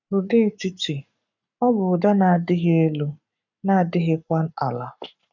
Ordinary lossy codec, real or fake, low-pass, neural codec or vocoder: none; fake; 7.2 kHz; codec, 44.1 kHz, 7.8 kbps, Pupu-Codec